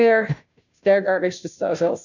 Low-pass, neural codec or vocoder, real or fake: 7.2 kHz; codec, 16 kHz, 0.5 kbps, FunCodec, trained on Chinese and English, 25 frames a second; fake